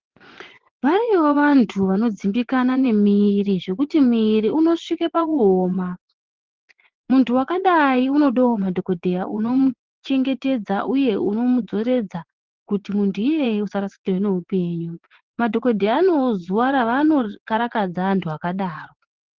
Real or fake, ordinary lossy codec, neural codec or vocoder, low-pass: fake; Opus, 16 kbps; vocoder, 24 kHz, 100 mel bands, Vocos; 7.2 kHz